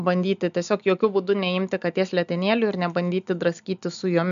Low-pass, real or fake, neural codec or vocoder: 7.2 kHz; real; none